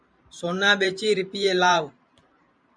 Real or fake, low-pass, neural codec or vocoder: fake; 9.9 kHz; vocoder, 44.1 kHz, 128 mel bands every 512 samples, BigVGAN v2